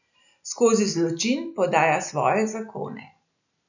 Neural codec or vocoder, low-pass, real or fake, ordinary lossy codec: none; 7.2 kHz; real; none